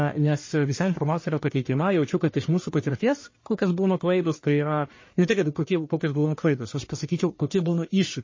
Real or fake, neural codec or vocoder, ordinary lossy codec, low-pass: fake; codec, 44.1 kHz, 1.7 kbps, Pupu-Codec; MP3, 32 kbps; 7.2 kHz